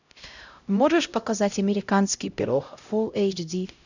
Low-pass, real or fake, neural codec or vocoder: 7.2 kHz; fake; codec, 16 kHz, 0.5 kbps, X-Codec, HuBERT features, trained on LibriSpeech